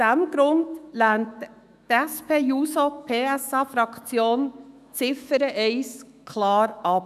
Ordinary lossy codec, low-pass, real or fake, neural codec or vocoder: none; 14.4 kHz; fake; autoencoder, 48 kHz, 128 numbers a frame, DAC-VAE, trained on Japanese speech